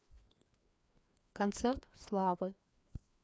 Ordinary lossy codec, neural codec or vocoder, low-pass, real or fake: none; codec, 16 kHz, 2 kbps, FreqCodec, larger model; none; fake